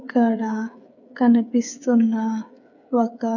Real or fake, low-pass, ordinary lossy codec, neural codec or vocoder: fake; 7.2 kHz; none; codec, 16 kHz, 8 kbps, FreqCodec, smaller model